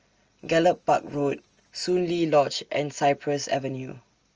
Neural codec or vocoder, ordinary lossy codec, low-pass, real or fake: none; Opus, 24 kbps; 7.2 kHz; real